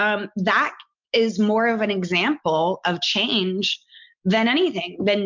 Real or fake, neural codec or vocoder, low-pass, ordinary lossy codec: real; none; 7.2 kHz; MP3, 64 kbps